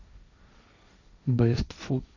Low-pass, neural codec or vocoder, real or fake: 7.2 kHz; codec, 16 kHz, 1.1 kbps, Voila-Tokenizer; fake